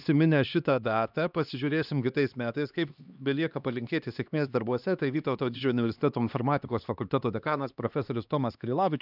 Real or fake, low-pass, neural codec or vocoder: fake; 5.4 kHz; codec, 16 kHz, 2 kbps, X-Codec, HuBERT features, trained on LibriSpeech